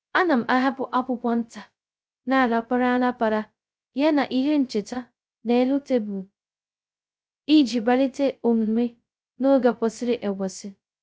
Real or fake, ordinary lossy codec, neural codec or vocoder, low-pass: fake; none; codec, 16 kHz, 0.2 kbps, FocalCodec; none